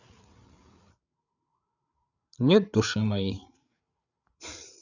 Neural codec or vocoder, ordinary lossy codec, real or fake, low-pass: codec, 16 kHz, 8 kbps, FreqCodec, larger model; none; fake; 7.2 kHz